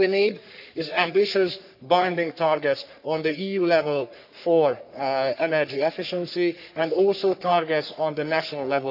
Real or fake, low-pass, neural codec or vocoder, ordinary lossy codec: fake; 5.4 kHz; codec, 44.1 kHz, 3.4 kbps, Pupu-Codec; none